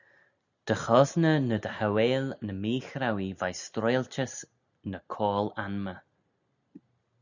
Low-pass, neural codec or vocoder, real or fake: 7.2 kHz; none; real